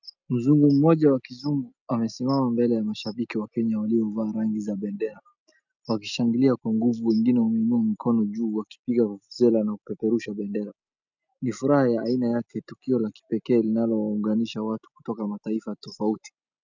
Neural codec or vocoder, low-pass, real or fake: none; 7.2 kHz; real